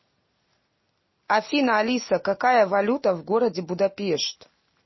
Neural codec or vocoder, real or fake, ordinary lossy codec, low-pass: none; real; MP3, 24 kbps; 7.2 kHz